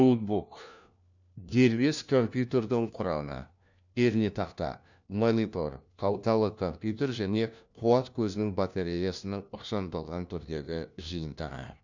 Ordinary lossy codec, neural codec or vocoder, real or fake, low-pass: none; codec, 16 kHz, 1 kbps, FunCodec, trained on LibriTTS, 50 frames a second; fake; 7.2 kHz